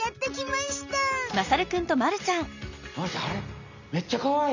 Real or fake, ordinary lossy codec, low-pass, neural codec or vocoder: real; none; 7.2 kHz; none